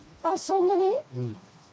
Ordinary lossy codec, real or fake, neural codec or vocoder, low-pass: none; fake; codec, 16 kHz, 2 kbps, FreqCodec, smaller model; none